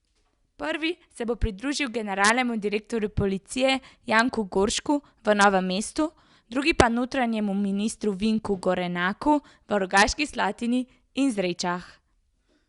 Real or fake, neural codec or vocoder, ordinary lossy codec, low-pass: real; none; Opus, 64 kbps; 10.8 kHz